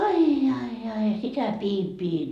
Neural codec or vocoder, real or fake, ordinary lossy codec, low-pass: none; real; none; 14.4 kHz